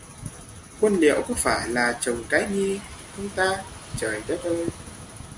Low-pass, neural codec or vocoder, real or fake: 10.8 kHz; none; real